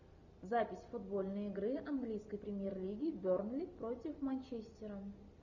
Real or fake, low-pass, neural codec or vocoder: real; 7.2 kHz; none